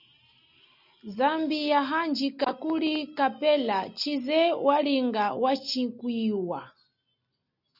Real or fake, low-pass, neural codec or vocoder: real; 5.4 kHz; none